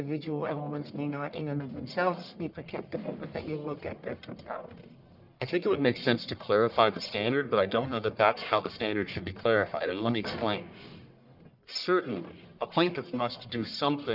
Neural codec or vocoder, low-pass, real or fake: codec, 44.1 kHz, 1.7 kbps, Pupu-Codec; 5.4 kHz; fake